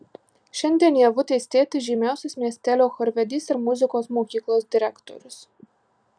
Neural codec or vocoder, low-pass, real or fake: none; 9.9 kHz; real